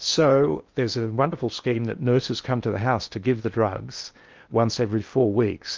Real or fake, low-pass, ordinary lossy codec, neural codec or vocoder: fake; 7.2 kHz; Opus, 24 kbps; codec, 16 kHz in and 24 kHz out, 0.8 kbps, FocalCodec, streaming, 65536 codes